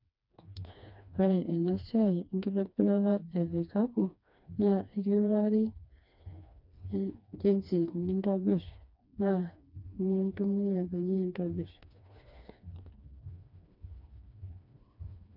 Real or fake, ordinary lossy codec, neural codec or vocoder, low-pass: fake; none; codec, 16 kHz, 2 kbps, FreqCodec, smaller model; 5.4 kHz